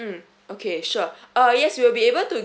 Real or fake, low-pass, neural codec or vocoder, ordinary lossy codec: real; none; none; none